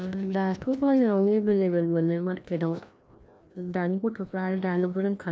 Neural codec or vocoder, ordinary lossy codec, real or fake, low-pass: codec, 16 kHz, 1 kbps, FreqCodec, larger model; none; fake; none